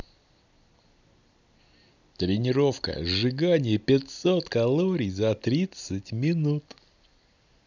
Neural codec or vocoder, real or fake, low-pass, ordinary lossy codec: none; real; 7.2 kHz; none